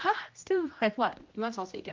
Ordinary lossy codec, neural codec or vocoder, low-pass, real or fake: Opus, 32 kbps; codec, 16 kHz, 1 kbps, X-Codec, HuBERT features, trained on general audio; 7.2 kHz; fake